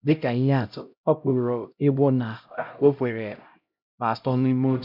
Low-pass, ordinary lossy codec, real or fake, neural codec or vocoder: 5.4 kHz; none; fake; codec, 16 kHz, 0.5 kbps, X-Codec, HuBERT features, trained on LibriSpeech